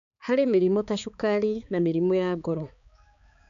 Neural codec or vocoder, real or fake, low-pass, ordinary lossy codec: codec, 16 kHz, 4 kbps, X-Codec, HuBERT features, trained on balanced general audio; fake; 7.2 kHz; none